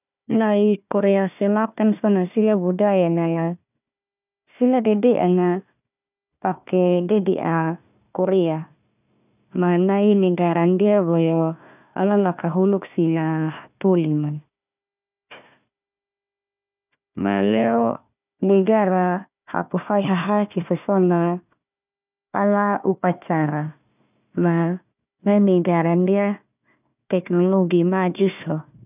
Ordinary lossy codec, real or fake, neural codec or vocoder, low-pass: none; fake; codec, 16 kHz, 1 kbps, FunCodec, trained on Chinese and English, 50 frames a second; 3.6 kHz